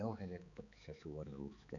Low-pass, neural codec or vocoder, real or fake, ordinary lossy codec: 7.2 kHz; codec, 16 kHz, 4 kbps, X-Codec, HuBERT features, trained on balanced general audio; fake; none